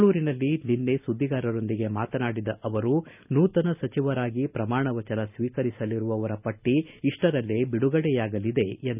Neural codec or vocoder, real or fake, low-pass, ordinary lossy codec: none; real; 3.6 kHz; none